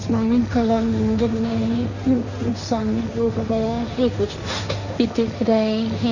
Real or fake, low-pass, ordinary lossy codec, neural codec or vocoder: fake; 7.2 kHz; none; codec, 16 kHz, 1.1 kbps, Voila-Tokenizer